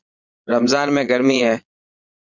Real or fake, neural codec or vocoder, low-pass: fake; vocoder, 22.05 kHz, 80 mel bands, Vocos; 7.2 kHz